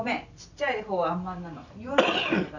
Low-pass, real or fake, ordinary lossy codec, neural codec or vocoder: 7.2 kHz; real; none; none